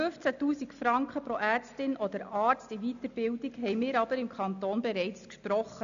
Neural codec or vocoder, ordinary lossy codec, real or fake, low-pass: none; AAC, 96 kbps; real; 7.2 kHz